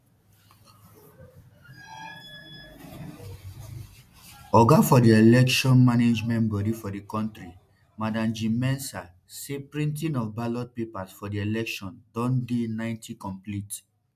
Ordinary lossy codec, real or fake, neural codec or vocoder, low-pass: MP3, 96 kbps; real; none; 14.4 kHz